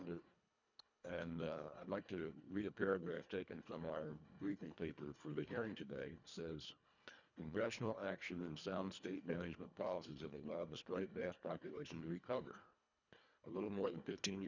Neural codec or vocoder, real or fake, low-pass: codec, 24 kHz, 1.5 kbps, HILCodec; fake; 7.2 kHz